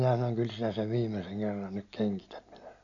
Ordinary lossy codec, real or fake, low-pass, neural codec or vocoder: none; real; 7.2 kHz; none